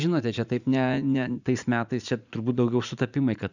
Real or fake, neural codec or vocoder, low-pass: fake; vocoder, 44.1 kHz, 80 mel bands, Vocos; 7.2 kHz